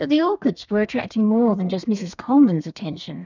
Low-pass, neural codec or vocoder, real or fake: 7.2 kHz; codec, 32 kHz, 1.9 kbps, SNAC; fake